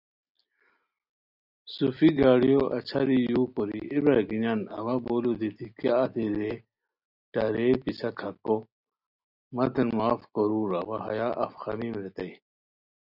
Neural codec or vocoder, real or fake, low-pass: none; real; 5.4 kHz